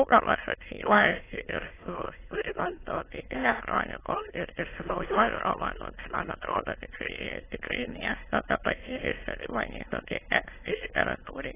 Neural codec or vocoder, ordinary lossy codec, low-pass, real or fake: autoencoder, 22.05 kHz, a latent of 192 numbers a frame, VITS, trained on many speakers; AAC, 16 kbps; 3.6 kHz; fake